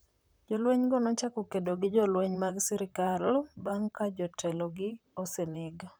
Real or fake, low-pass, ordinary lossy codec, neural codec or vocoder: fake; none; none; vocoder, 44.1 kHz, 128 mel bands, Pupu-Vocoder